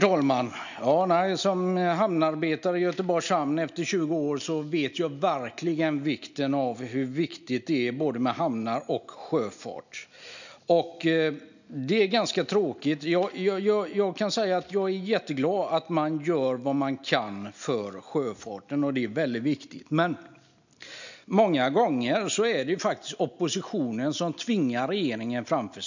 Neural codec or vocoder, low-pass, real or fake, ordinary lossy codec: none; 7.2 kHz; real; none